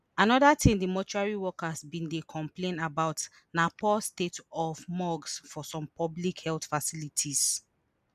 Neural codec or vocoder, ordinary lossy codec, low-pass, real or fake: none; none; 14.4 kHz; real